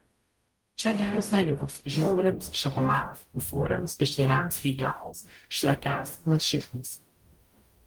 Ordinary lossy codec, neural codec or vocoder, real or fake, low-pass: Opus, 32 kbps; codec, 44.1 kHz, 0.9 kbps, DAC; fake; 19.8 kHz